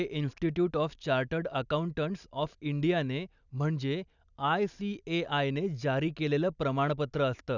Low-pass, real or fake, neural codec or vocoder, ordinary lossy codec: 7.2 kHz; real; none; none